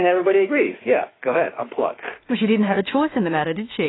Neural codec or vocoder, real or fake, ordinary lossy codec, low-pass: autoencoder, 48 kHz, 32 numbers a frame, DAC-VAE, trained on Japanese speech; fake; AAC, 16 kbps; 7.2 kHz